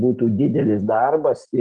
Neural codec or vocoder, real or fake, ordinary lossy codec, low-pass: none; real; Opus, 24 kbps; 9.9 kHz